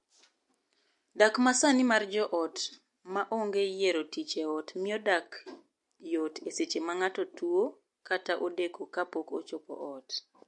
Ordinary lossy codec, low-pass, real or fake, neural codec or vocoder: MP3, 48 kbps; 10.8 kHz; real; none